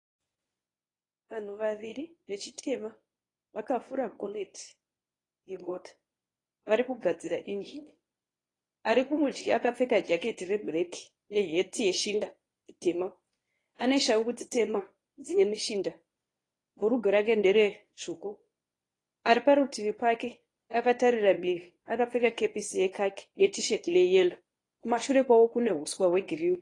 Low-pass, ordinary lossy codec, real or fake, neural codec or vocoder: 10.8 kHz; AAC, 32 kbps; fake; codec, 24 kHz, 0.9 kbps, WavTokenizer, medium speech release version 1